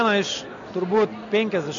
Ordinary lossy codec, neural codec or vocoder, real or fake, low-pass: MP3, 96 kbps; none; real; 7.2 kHz